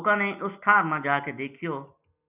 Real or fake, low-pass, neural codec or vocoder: real; 3.6 kHz; none